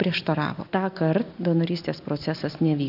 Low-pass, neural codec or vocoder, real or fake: 5.4 kHz; none; real